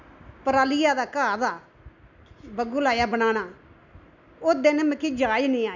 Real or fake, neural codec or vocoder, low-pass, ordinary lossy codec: real; none; 7.2 kHz; none